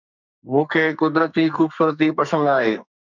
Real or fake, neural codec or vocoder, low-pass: fake; codec, 44.1 kHz, 2.6 kbps, SNAC; 7.2 kHz